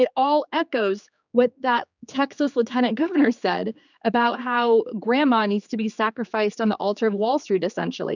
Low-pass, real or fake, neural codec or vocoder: 7.2 kHz; fake; codec, 16 kHz, 4 kbps, X-Codec, HuBERT features, trained on general audio